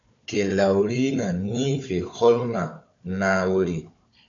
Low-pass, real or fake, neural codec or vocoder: 7.2 kHz; fake; codec, 16 kHz, 4 kbps, FunCodec, trained on Chinese and English, 50 frames a second